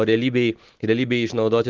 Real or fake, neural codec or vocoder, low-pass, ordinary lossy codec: real; none; 7.2 kHz; Opus, 16 kbps